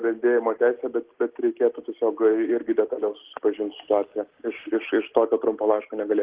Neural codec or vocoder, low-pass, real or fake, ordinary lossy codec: none; 3.6 kHz; real; Opus, 16 kbps